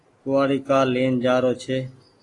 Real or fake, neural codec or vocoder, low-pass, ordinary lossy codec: real; none; 10.8 kHz; AAC, 32 kbps